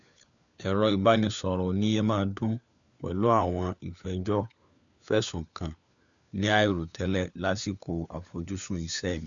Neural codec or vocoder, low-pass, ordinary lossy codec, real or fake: codec, 16 kHz, 4 kbps, FunCodec, trained on LibriTTS, 50 frames a second; 7.2 kHz; none; fake